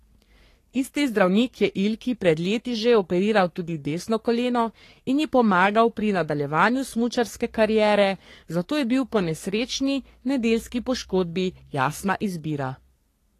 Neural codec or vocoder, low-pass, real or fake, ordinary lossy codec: codec, 44.1 kHz, 3.4 kbps, Pupu-Codec; 14.4 kHz; fake; AAC, 48 kbps